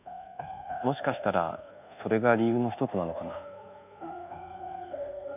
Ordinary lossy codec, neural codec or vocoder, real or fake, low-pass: none; codec, 24 kHz, 1.2 kbps, DualCodec; fake; 3.6 kHz